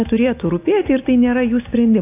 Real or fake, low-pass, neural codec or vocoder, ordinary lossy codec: real; 3.6 kHz; none; AAC, 24 kbps